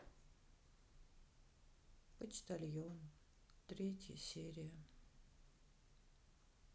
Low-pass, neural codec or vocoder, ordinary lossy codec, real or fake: none; none; none; real